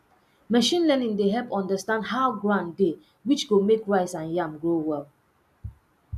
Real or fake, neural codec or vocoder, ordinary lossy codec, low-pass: real; none; none; 14.4 kHz